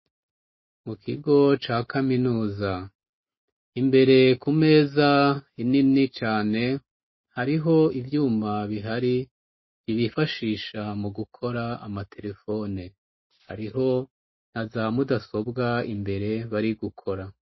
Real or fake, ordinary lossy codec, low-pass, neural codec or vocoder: real; MP3, 24 kbps; 7.2 kHz; none